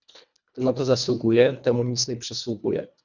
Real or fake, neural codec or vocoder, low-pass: fake; codec, 24 kHz, 1.5 kbps, HILCodec; 7.2 kHz